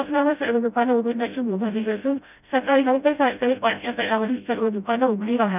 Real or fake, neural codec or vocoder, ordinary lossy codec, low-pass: fake; codec, 16 kHz, 0.5 kbps, FreqCodec, smaller model; none; 3.6 kHz